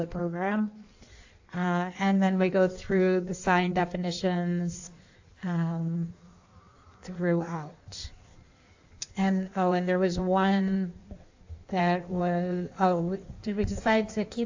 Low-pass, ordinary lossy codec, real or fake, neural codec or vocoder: 7.2 kHz; AAC, 48 kbps; fake; codec, 16 kHz in and 24 kHz out, 1.1 kbps, FireRedTTS-2 codec